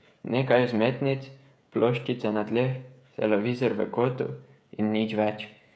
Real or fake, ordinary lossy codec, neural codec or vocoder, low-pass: fake; none; codec, 16 kHz, 16 kbps, FreqCodec, smaller model; none